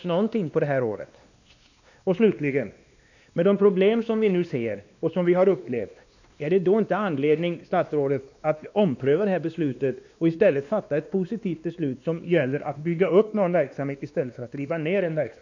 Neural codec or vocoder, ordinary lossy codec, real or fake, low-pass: codec, 16 kHz, 2 kbps, X-Codec, WavLM features, trained on Multilingual LibriSpeech; none; fake; 7.2 kHz